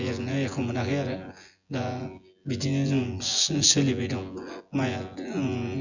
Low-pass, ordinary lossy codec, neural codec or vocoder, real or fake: 7.2 kHz; none; vocoder, 24 kHz, 100 mel bands, Vocos; fake